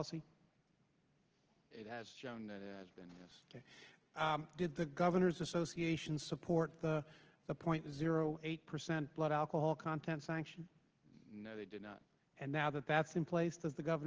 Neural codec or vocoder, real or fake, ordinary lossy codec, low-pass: none; real; Opus, 16 kbps; 7.2 kHz